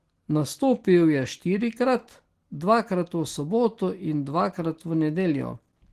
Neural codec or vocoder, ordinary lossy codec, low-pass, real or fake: none; Opus, 16 kbps; 14.4 kHz; real